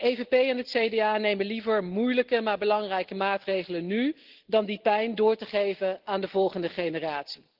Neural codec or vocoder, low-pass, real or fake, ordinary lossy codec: none; 5.4 kHz; real; Opus, 16 kbps